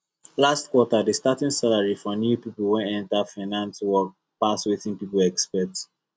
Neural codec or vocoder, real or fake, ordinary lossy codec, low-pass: none; real; none; none